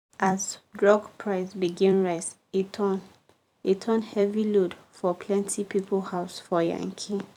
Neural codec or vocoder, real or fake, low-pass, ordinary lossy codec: vocoder, 44.1 kHz, 128 mel bands every 256 samples, BigVGAN v2; fake; 19.8 kHz; none